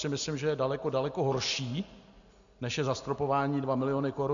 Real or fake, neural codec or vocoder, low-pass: real; none; 7.2 kHz